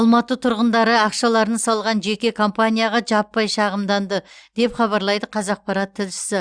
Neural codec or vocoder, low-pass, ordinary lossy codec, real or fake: none; 9.9 kHz; Opus, 64 kbps; real